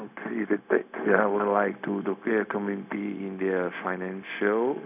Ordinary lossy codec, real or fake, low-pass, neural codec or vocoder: none; fake; 3.6 kHz; codec, 16 kHz, 0.4 kbps, LongCat-Audio-Codec